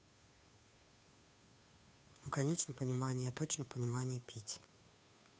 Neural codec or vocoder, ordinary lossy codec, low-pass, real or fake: codec, 16 kHz, 2 kbps, FunCodec, trained on Chinese and English, 25 frames a second; none; none; fake